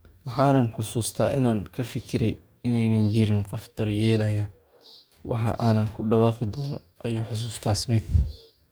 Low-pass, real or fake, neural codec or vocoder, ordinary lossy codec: none; fake; codec, 44.1 kHz, 2.6 kbps, DAC; none